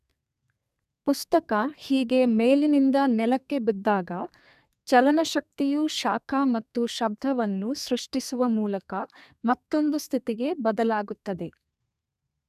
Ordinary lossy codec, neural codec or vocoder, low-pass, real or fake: none; codec, 32 kHz, 1.9 kbps, SNAC; 14.4 kHz; fake